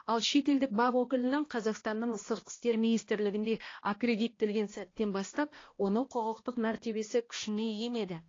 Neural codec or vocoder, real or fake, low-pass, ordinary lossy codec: codec, 16 kHz, 1 kbps, X-Codec, HuBERT features, trained on balanced general audio; fake; 7.2 kHz; AAC, 32 kbps